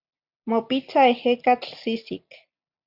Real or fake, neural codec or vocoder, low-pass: real; none; 5.4 kHz